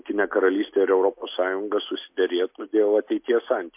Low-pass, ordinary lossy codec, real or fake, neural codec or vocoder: 3.6 kHz; MP3, 32 kbps; real; none